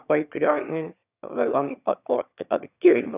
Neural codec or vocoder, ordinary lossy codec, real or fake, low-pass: autoencoder, 22.05 kHz, a latent of 192 numbers a frame, VITS, trained on one speaker; none; fake; 3.6 kHz